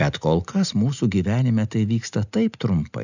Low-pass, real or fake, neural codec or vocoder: 7.2 kHz; real; none